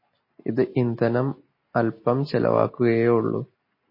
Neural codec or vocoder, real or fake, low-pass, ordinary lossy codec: none; real; 5.4 kHz; MP3, 24 kbps